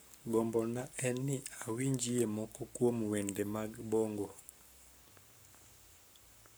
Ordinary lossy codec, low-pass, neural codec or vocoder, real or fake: none; none; codec, 44.1 kHz, 7.8 kbps, Pupu-Codec; fake